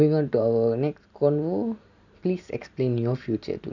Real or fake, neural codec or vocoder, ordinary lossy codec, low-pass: real; none; none; 7.2 kHz